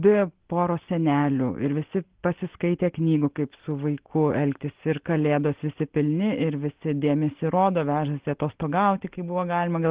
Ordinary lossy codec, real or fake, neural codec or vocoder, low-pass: Opus, 16 kbps; real; none; 3.6 kHz